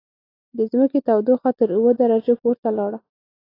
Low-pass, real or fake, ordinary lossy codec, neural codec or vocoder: 5.4 kHz; real; AAC, 24 kbps; none